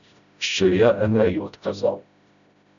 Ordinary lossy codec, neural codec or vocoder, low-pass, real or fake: MP3, 96 kbps; codec, 16 kHz, 0.5 kbps, FreqCodec, smaller model; 7.2 kHz; fake